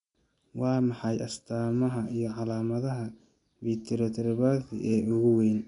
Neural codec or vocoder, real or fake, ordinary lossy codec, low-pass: none; real; none; 10.8 kHz